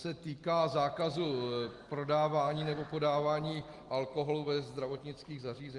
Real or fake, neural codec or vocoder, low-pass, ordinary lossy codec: real; none; 10.8 kHz; Opus, 24 kbps